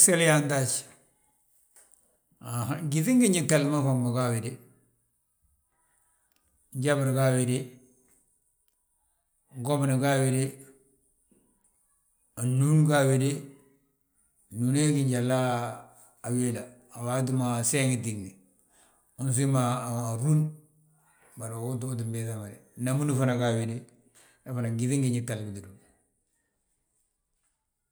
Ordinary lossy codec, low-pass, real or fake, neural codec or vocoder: none; none; real; none